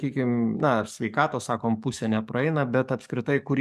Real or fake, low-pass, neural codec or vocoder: fake; 14.4 kHz; codec, 44.1 kHz, 7.8 kbps, DAC